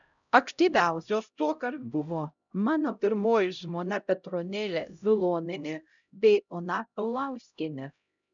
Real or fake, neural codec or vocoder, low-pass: fake; codec, 16 kHz, 0.5 kbps, X-Codec, HuBERT features, trained on LibriSpeech; 7.2 kHz